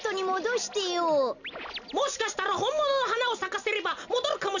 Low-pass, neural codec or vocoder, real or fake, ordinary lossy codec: 7.2 kHz; none; real; none